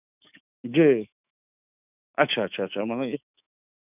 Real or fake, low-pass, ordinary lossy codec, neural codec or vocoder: fake; 3.6 kHz; none; autoencoder, 48 kHz, 128 numbers a frame, DAC-VAE, trained on Japanese speech